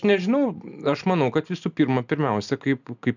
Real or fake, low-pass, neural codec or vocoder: real; 7.2 kHz; none